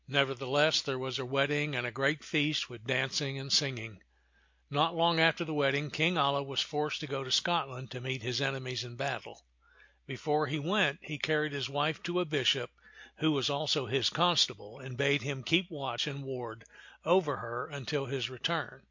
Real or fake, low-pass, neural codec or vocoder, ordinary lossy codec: real; 7.2 kHz; none; MP3, 48 kbps